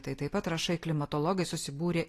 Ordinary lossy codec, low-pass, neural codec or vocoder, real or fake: AAC, 48 kbps; 14.4 kHz; none; real